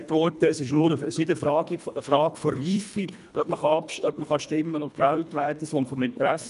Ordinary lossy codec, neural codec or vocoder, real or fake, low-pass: none; codec, 24 kHz, 1.5 kbps, HILCodec; fake; 10.8 kHz